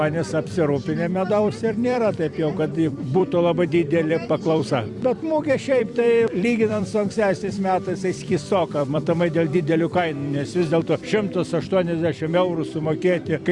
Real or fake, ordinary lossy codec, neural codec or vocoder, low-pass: fake; Opus, 64 kbps; vocoder, 44.1 kHz, 128 mel bands every 256 samples, BigVGAN v2; 10.8 kHz